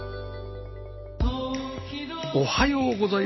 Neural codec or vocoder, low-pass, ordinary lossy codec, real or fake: none; 7.2 kHz; MP3, 24 kbps; real